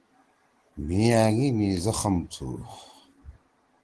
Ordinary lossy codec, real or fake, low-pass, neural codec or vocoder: Opus, 16 kbps; real; 10.8 kHz; none